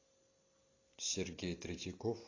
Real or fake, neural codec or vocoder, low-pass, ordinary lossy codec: real; none; 7.2 kHz; AAC, 32 kbps